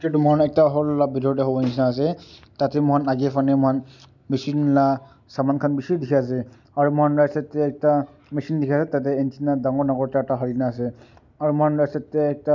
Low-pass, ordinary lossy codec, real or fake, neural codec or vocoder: 7.2 kHz; none; real; none